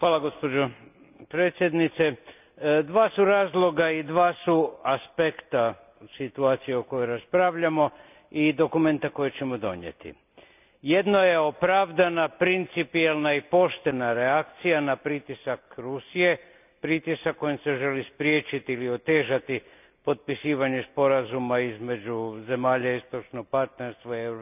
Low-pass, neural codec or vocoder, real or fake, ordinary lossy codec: 3.6 kHz; none; real; none